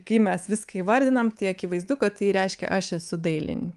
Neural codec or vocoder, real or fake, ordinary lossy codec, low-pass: codec, 24 kHz, 3.1 kbps, DualCodec; fake; Opus, 24 kbps; 10.8 kHz